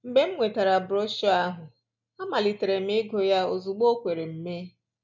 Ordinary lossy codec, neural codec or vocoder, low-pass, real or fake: none; none; 7.2 kHz; real